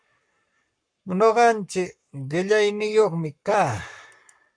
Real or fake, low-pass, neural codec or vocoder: fake; 9.9 kHz; codec, 44.1 kHz, 7.8 kbps, Pupu-Codec